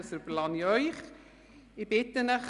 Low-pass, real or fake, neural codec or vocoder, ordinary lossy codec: 10.8 kHz; real; none; Opus, 64 kbps